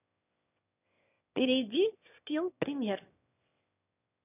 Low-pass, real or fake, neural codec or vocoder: 3.6 kHz; fake; autoencoder, 22.05 kHz, a latent of 192 numbers a frame, VITS, trained on one speaker